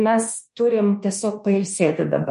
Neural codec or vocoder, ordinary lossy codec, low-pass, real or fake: codec, 24 kHz, 1.2 kbps, DualCodec; MP3, 48 kbps; 10.8 kHz; fake